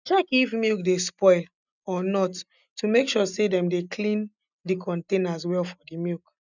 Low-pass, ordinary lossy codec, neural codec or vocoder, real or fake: 7.2 kHz; none; none; real